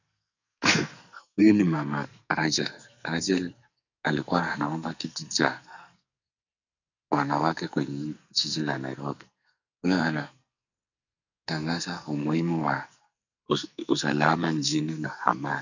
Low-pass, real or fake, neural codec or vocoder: 7.2 kHz; fake; codec, 44.1 kHz, 2.6 kbps, SNAC